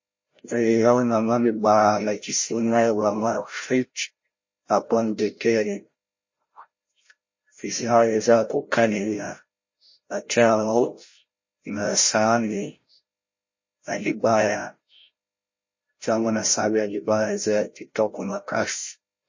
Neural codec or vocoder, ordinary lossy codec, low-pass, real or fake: codec, 16 kHz, 0.5 kbps, FreqCodec, larger model; MP3, 32 kbps; 7.2 kHz; fake